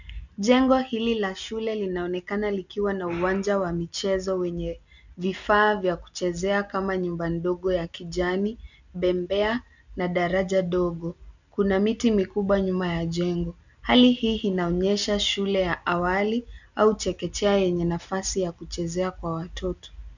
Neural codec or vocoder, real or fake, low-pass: none; real; 7.2 kHz